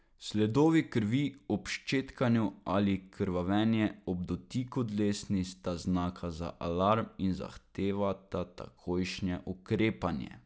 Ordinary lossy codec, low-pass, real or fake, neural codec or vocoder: none; none; real; none